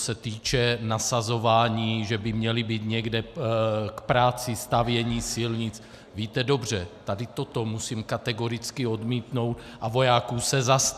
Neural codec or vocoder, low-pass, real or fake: none; 14.4 kHz; real